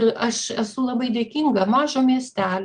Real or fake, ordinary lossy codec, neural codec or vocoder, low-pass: real; Opus, 32 kbps; none; 9.9 kHz